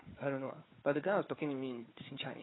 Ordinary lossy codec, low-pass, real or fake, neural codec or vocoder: AAC, 16 kbps; 7.2 kHz; fake; codec, 16 kHz, 4 kbps, FunCodec, trained on Chinese and English, 50 frames a second